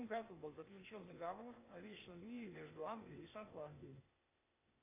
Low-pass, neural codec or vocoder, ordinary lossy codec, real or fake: 3.6 kHz; codec, 16 kHz, 0.8 kbps, ZipCodec; AAC, 24 kbps; fake